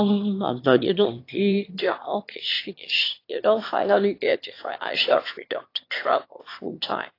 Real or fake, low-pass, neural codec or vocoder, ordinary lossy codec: fake; 5.4 kHz; autoencoder, 22.05 kHz, a latent of 192 numbers a frame, VITS, trained on one speaker; AAC, 32 kbps